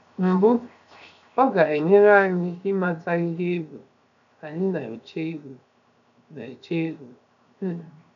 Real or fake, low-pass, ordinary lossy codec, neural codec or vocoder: fake; 7.2 kHz; none; codec, 16 kHz, 0.7 kbps, FocalCodec